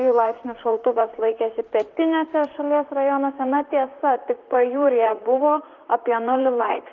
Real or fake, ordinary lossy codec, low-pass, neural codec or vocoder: fake; Opus, 32 kbps; 7.2 kHz; vocoder, 44.1 kHz, 128 mel bands, Pupu-Vocoder